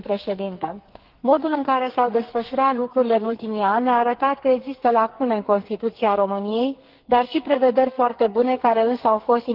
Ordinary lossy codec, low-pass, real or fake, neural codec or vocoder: Opus, 16 kbps; 5.4 kHz; fake; codec, 44.1 kHz, 2.6 kbps, SNAC